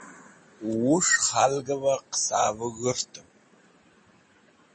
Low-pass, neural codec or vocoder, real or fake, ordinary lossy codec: 10.8 kHz; none; real; MP3, 32 kbps